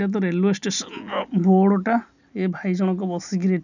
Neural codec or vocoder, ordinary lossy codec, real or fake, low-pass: none; none; real; 7.2 kHz